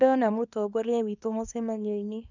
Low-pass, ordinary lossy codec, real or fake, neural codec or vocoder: 7.2 kHz; none; fake; codec, 24 kHz, 0.9 kbps, WavTokenizer, small release